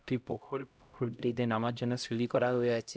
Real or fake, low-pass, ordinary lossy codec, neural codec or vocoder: fake; none; none; codec, 16 kHz, 0.5 kbps, X-Codec, HuBERT features, trained on LibriSpeech